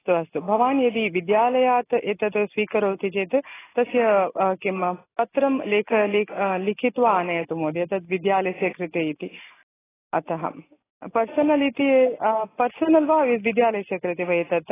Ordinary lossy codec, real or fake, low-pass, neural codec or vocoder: AAC, 16 kbps; real; 3.6 kHz; none